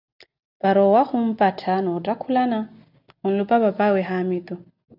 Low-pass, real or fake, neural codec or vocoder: 5.4 kHz; real; none